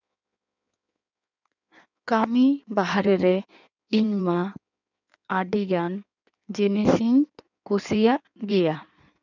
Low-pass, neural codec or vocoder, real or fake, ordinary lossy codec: 7.2 kHz; codec, 16 kHz in and 24 kHz out, 1.1 kbps, FireRedTTS-2 codec; fake; none